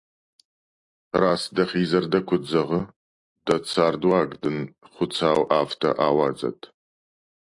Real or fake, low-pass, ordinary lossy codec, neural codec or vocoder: real; 10.8 kHz; AAC, 64 kbps; none